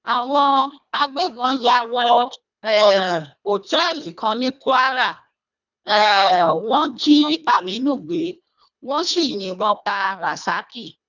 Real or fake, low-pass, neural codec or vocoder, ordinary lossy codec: fake; 7.2 kHz; codec, 24 kHz, 1.5 kbps, HILCodec; none